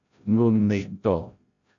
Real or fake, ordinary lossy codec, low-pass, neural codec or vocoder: fake; AAC, 48 kbps; 7.2 kHz; codec, 16 kHz, 0.5 kbps, FreqCodec, larger model